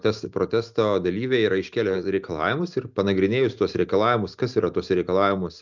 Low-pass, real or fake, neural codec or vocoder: 7.2 kHz; real; none